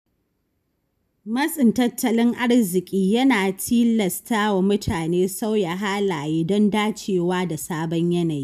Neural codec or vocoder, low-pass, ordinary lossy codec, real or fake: none; 14.4 kHz; none; real